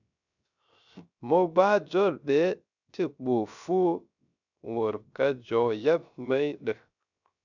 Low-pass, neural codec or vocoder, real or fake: 7.2 kHz; codec, 16 kHz, 0.3 kbps, FocalCodec; fake